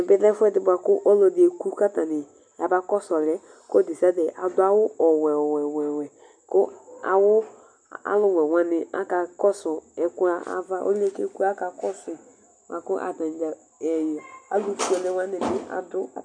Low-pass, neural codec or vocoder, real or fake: 9.9 kHz; none; real